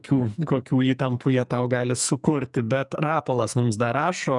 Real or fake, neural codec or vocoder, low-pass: fake; codec, 44.1 kHz, 2.6 kbps, SNAC; 10.8 kHz